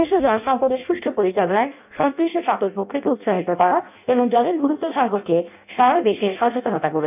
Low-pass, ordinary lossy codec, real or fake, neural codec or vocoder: 3.6 kHz; none; fake; codec, 16 kHz in and 24 kHz out, 0.6 kbps, FireRedTTS-2 codec